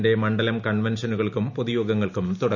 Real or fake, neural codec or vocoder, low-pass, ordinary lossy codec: real; none; 7.2 kHz; none